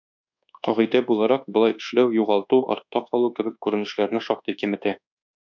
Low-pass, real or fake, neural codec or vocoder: 7.2 kHz; fake; codec, 24 kHz, 1.2 kbps, DualCodec